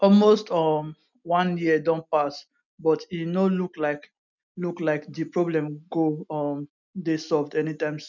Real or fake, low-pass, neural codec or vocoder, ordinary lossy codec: real; 7.2 kHz; none; none